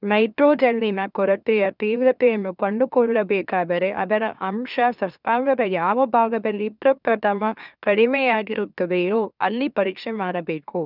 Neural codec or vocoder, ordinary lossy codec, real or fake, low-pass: autoencoder, 44.1 kHz, a latent of 192 numbers a frame, MeloTTS; none; fake; 5.4 kHz